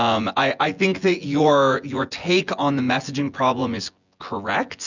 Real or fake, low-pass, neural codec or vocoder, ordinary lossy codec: fake; 7.2 kHz; vocoder, 24 kHz, 100 mel bands, Vocos; Opus, 64 kbps